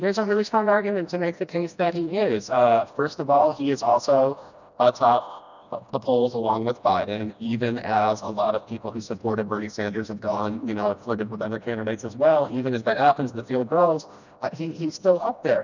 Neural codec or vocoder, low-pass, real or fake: codec, 16 kHz, 1 kbps, FreqCodec, smaller model; 7.2 kHz; fake